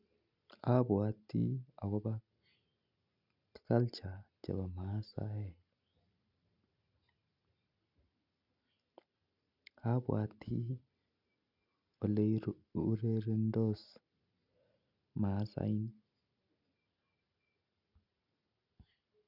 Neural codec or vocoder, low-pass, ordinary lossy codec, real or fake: none; 5.4 kHz; none; real